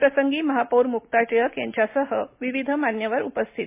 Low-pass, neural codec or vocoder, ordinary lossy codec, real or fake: 3.6 kHz; none; MP3, 32 kbps; real